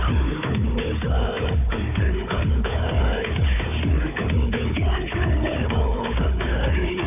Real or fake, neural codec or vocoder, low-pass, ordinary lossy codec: fake; codec, 16 kHz, 4 kbps, FunCodec, trained on Chinese and English, 50 frames a second; 3.6 kHz; none